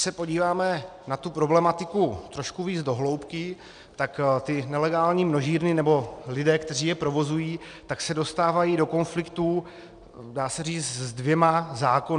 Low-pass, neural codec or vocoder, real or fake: 9.9 kHz; none; real